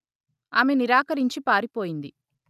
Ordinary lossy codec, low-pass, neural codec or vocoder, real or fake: none; 14.4 kHz; none; real